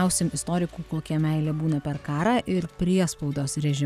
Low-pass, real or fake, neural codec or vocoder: 14.4 kHz; real; none